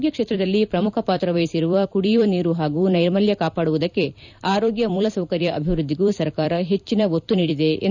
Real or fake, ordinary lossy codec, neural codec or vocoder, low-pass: fake; none; vocoder, 44.1 kHz, 128 mel bands every 256 samples, BigVGAN v2; 7.2 kHz